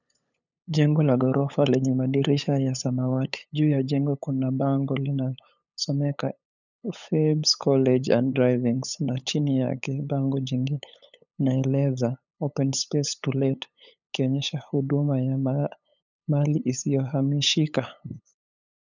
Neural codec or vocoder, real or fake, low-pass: codec, 16 kHz, 8 kbps, FunCodec, trained on LibriTTS, 25 frames a second; fake; 7.2 kHz